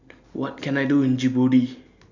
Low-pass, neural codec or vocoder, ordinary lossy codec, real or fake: 7.2 kHz; none; none; real